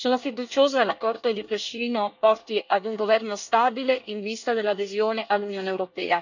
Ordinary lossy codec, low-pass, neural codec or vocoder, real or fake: none; 7.2 kHz; codec, 24 kHz, 1 kbps, SNAC; fake